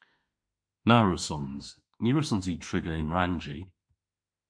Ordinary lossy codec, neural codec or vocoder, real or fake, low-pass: MP3, 64 kbps; autoencoder, 48 kHz, 32 numbers a frame, DAC-VAE, trained on Japanese speech; fake; 9.9 kHz